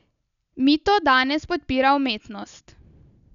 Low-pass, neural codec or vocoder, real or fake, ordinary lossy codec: 7.2 kHz; none; real; none